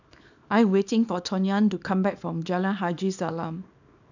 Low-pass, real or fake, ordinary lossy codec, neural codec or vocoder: 7.2 kHz; fake; none; codec, 24 kHz, 0.9 kbps, WavTokenizer, small release